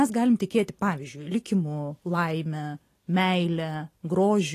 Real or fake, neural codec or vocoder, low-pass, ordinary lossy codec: real; none; 14.4 kHz; AAC, 48 kbps